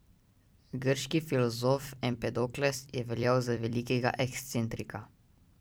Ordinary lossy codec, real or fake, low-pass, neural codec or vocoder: none; fake; none; vocoder, 44.1 kHz, 128 mel bands every 256 samples, BigVGAN v2